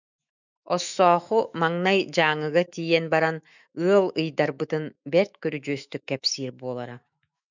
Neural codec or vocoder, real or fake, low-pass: autoencoder, 48 kHz, 128 numbers a frame, DAC-VAE, trained on Japanese speech; fake; 7.2 kHz